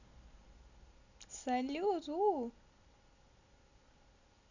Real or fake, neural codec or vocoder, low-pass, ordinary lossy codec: real; none; 7.2 kHz; none